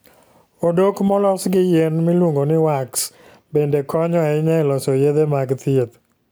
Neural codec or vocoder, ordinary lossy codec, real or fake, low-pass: none; none; real; none